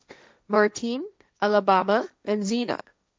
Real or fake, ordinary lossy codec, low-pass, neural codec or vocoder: fake; none; none; codec, 16 kHz, 1.1 kbps, Voila-Tokenizer